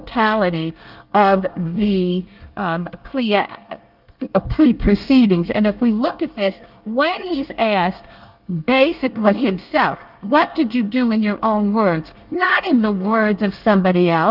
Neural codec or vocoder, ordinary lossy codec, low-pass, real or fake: codec, 24 kHz, 1 kbps, SNAC; Opus, 32 kbps; 5.4 kHz; fake